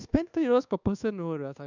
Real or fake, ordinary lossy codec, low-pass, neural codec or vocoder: fake; none; 7.2 kHz; codec, 24 kHz, 1.2 kbps, DualCodec